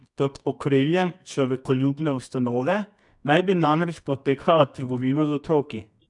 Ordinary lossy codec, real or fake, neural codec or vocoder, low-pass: none; fake; codec, 24 kHz, 0.9 kbps, WavTokenizer, medium music audio release; 10.8 kHz